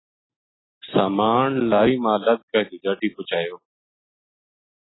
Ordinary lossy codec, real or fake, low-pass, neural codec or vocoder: AAC, 16 kbps; real; 7.2 kHz; none